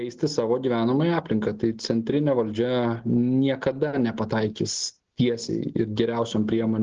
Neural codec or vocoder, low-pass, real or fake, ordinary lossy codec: none; 7.2 kHz; real; Opus, 16 kbps